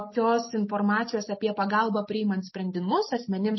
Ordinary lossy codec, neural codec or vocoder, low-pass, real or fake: MP3, 24 kbps; none; 7.2 kHz; real